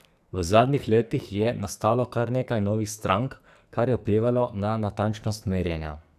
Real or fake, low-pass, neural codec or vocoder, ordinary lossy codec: fake; 14.4 kHz; codec, 44.1 kHz, 2.6 kbps, SNAC; none